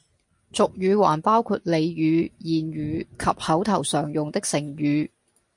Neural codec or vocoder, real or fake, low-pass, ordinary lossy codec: none; real; 10.8 kHz; MP3, 48 kbps